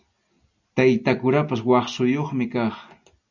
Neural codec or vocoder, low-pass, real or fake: none; 7.2 kHz; real